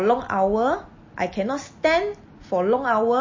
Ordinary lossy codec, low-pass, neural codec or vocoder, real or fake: MP3, 32 kbps; 7.2 kHz; none; real